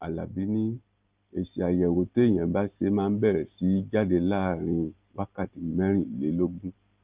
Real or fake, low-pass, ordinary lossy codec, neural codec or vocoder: real; 3.6 kHz; Opus, 32 kbps; none